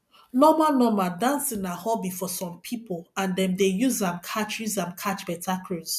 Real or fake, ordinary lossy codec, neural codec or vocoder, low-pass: real; none; none; 14.4 kHz